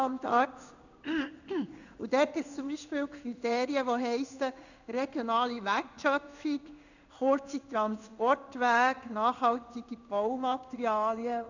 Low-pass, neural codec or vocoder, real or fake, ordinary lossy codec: 7.2 kHz; codec, 16 kHz in and 24 kHz out, 1 kbps, XY-Tokenizer; fake; none